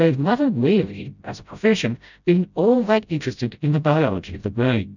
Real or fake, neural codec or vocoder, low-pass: fake; codec, 16 kHz, 0.5 kbps, FreqCodec, smaller model; 7.2 kHz